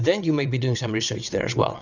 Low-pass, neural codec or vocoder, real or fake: 7.2 kHz; vocoder, 22.05 kHz, 80 mel bands, WaveNeXt; fake